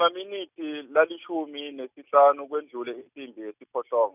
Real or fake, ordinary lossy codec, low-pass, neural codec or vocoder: real; none; 3.6 kHz; none